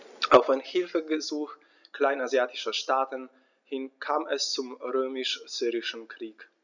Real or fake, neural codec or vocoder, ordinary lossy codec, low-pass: real; none; none; 7.2 kHz